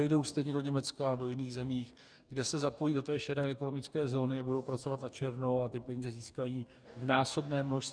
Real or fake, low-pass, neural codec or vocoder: fake; 9.9 kHz; codec, 44.1 kHz, 2.6 kbps, DAC